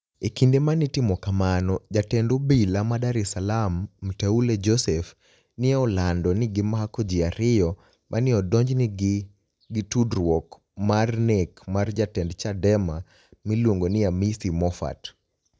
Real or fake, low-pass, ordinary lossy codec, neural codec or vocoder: real; none; none; none